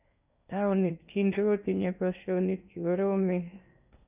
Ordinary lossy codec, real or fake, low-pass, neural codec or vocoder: none; fake; 3.6 kHz; codec, 16 kHz in and 24 kHz out, 0.8 kbps, FocalCodec, streaming, 65536 codes